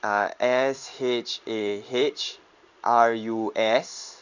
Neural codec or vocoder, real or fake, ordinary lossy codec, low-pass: codec, 16 kHz in and 24 kHz out, 1 kbps, XY-Tokenizer; fake; none; 7.2 kHz